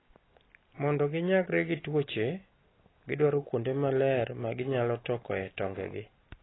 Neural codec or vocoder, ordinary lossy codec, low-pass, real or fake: none; AAC, 16 kbps; 7.2 kHz; real